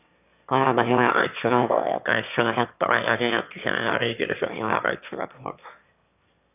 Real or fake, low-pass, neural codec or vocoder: fake; 3.6 kHz; autoencoder, 22.05 kHz, a latent of 192 numbers a frame, VITS, trained on one speaker